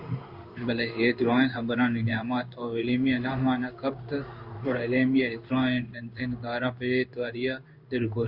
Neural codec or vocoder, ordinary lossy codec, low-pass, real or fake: codec, 16 kHz in and 24 kHz out, 1 kbps, XY-Tokenizer; Opus, 64 kbps; 5.4 kHz; fake